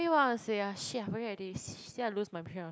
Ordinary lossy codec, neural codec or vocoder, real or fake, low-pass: none; none; real; none